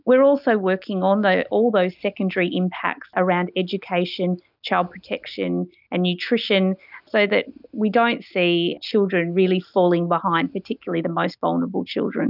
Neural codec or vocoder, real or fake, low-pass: none; real; 5.4 kHz